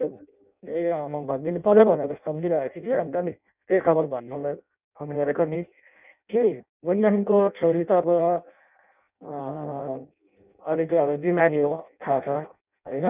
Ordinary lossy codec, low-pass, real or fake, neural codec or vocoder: none; 3.6 kHz; fake; codec, 16 kHz in and 24 kHz out, 0.6 kbps, FireRedTTS-2 codec